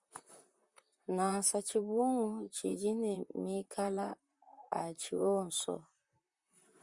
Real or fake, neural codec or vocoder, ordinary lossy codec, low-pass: fake; vocoder, 44.1 kHz, 128 mel bands, Pupu-Vocoder; Opus, 64 kbps; 10.8 kHz